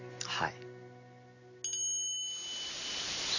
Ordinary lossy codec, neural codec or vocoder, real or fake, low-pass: none; none; real; 7.2 kHz